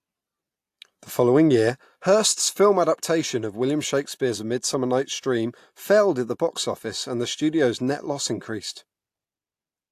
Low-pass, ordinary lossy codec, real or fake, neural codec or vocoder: 14.4 kHz; AAC, 64 kbps; real; none